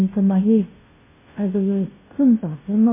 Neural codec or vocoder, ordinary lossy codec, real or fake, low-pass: codec, 16 kHz, 0.5 kbps, FunCodec, trained on Chinese and English, 25 frames a second; MP3, 16 kbps; fake; 3.6 kHz